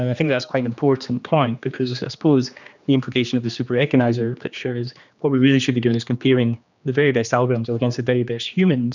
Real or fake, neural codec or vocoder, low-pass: fake; codec, 16 kHz, 2 kbps, X-Codec, HuBERT features, trained on general audio; 7.2 kHz